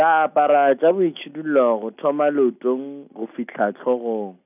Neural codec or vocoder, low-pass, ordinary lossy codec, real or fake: none; 3.6 kHz; none; real